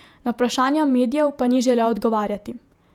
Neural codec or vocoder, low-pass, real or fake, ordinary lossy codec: vocoder, 48 kHz, 128 mel bands, Vocos; 19.8 kHz; fake; none